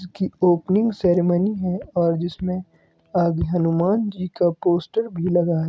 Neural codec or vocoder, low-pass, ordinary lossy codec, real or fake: none; none; none; real